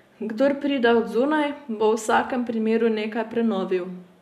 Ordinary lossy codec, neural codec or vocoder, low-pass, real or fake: none; none; 14.4 kHz; real